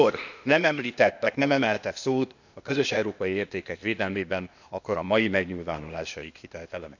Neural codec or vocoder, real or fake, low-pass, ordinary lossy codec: codec, 16 kHz, 0.8 kbps, ZipCodec; fake; 7.2 kHz; none